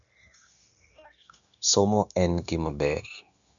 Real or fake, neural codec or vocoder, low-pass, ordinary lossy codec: fake; codec, 16 kHz, 0.9 kbps, LongCat-Audio-Codec; 7.2 kHz; none